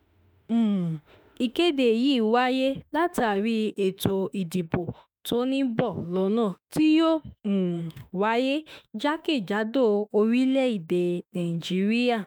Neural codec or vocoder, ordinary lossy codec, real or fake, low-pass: autoencoder, 48 kHz, 32 numbers a frame, DAC-VAE, trained on Japanese speech; none; fake; none